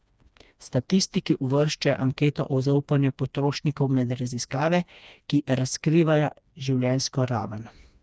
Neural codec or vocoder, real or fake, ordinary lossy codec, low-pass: codec, 16 kHz, 2 kbps, FreqCodec, smaller model; fake; none; none